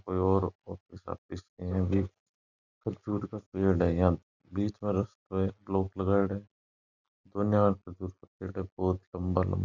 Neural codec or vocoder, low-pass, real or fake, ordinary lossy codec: none; 7.2 kHz; real; none